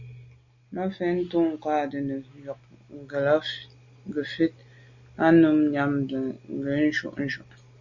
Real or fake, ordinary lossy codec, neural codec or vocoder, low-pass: real; Opus, 64 kbps; none; 7.2 kHz